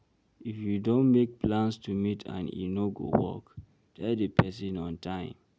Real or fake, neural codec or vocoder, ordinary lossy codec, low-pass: real; none; none; none